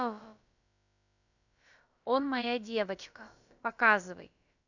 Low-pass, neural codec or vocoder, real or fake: 7.2 kHz; codec, 16 kHz, about 1 kbps, DyCAST, with the encoder's durations; fake